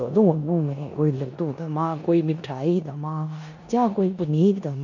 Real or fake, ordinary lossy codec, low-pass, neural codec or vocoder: fake; none; 7.2 kHz; codec, 16 kHz in and 24 kHz out, 0.9 kbps, LongCat-Audio-Codec, four codebook decoder